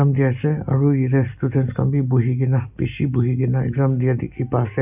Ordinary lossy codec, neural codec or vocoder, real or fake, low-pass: none; none; real; 3.6 kHz